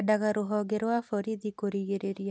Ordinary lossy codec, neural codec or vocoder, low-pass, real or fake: none; none; none; real